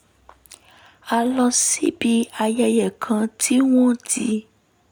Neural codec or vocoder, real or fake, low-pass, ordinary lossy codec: none; real; none; none